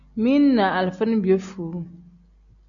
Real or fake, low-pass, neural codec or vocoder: real; 7.2 kHz; none